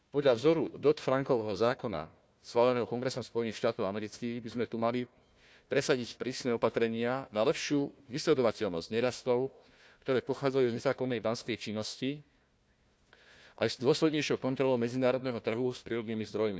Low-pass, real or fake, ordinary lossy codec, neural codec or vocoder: none; fake; none; codec, 16 kHz, 1 kbps, FunCodec, trained on Chinese and English, 50 frames a second